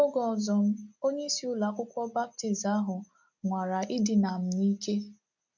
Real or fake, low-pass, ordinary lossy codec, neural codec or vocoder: real; 7.2 kHz; none; none